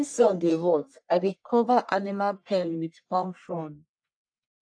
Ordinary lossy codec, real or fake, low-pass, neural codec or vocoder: AAC, 64 kbps; fake; 9.9 kHz; codec, 44.1 kHz, 1.7 kbps, Pupu-Codec